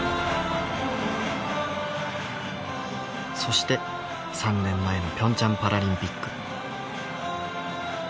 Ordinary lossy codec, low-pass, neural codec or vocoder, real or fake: none; none; none; real